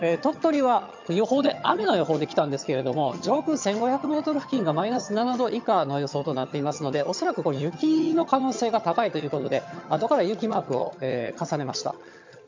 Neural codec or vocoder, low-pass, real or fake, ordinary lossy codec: vocoder, 22.05 kHz, 80 mel bands, HiFi-GAN; 7.2 kHz; fake; MP3, 64 kbps